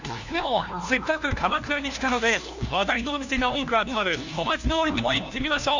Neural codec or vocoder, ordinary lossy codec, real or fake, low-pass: codec, 16 kHz, 1 kbps, FunCodec, trained on LibriTTS, 50 frames a second; none; fake; 7.2 kHz